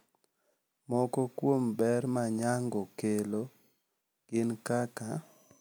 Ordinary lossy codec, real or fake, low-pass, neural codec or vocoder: none; real; none; none